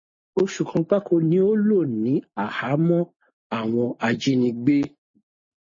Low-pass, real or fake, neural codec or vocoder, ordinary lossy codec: 7.2 kHz; real; none; MP3, 32 kbps